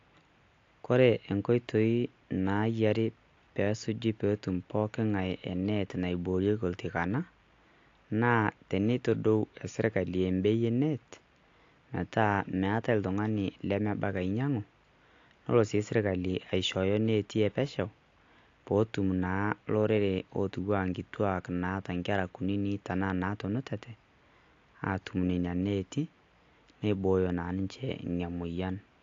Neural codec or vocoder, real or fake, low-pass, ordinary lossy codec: none; real; 7.2 kHz; AAC, 48 kbps